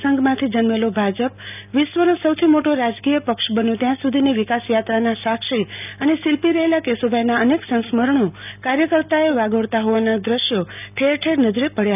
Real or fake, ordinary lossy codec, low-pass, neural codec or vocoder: real; none; 3.6 kHz; none